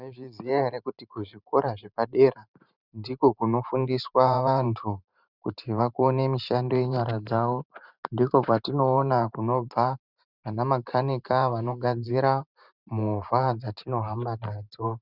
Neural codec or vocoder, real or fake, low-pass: vocoder, 44.1 kHz, 128 mel bands every 512 samples, BigVGAN v2; fake; 5.4 kHz